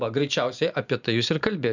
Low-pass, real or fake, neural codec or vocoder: 7.2 kHz; real; none